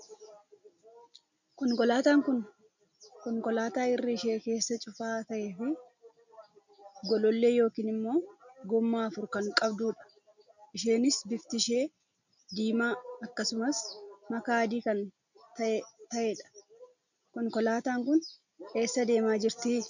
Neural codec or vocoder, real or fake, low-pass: none; real; 7.2 kHz